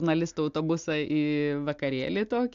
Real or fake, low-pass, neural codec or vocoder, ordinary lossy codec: real; 7.2 kHz; none; AAC, 64 kbps